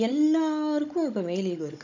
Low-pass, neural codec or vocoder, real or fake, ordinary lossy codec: 7.2 kHz; codec, 16 kHz, 16 kbps, FunCodec, trained on Chinese and English, 50 frames a second; fake; none